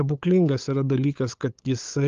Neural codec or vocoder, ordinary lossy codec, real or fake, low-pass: codec, 16 kHz, 8 kbps, FunCodec, trained on LibriTTS, 25 frames a second; Opus, 32 kbps; fake; 7.2 kHz